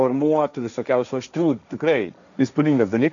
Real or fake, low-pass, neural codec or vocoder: fake; 7.2 kHz; codec, 16 kHz, 1.1 kbps, Voila-Tokenizer